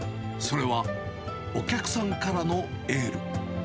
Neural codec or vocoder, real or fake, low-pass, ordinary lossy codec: none; real; none; none